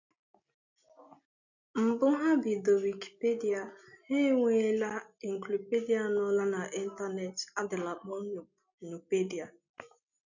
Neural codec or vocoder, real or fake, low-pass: none; real; 7.2 kHz